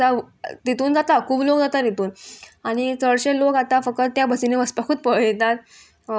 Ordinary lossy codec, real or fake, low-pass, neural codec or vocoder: none; real; none; none